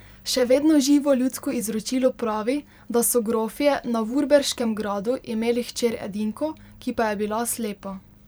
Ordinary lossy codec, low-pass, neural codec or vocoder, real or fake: none; none; vocoder, 44.1 kHz, 128 mel bands every 512 samples, BigVGAN v2; fake